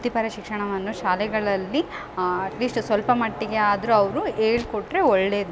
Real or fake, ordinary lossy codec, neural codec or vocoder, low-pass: real; none; none; none